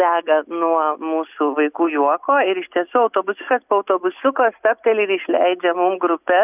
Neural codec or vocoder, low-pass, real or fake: none; 3.6 kHz; real